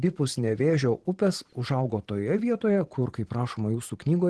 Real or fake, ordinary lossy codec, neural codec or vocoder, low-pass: fake; Opus, 16 kbps; vocoder, 44.1 kHz, 128 mel bands every 512 samples, BigVGAN v2; 10.8 kHz